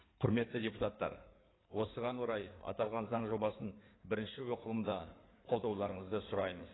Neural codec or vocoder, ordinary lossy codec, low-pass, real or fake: codec, 16 kHz in and 24 kHz out, 2.2 kbps, FireRedTTS-2 codec; AAC, 16 kbps; 7.2 kHz; fake